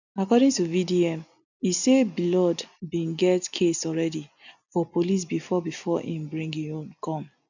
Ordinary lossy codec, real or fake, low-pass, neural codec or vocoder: none; real; 7.2 kHz; none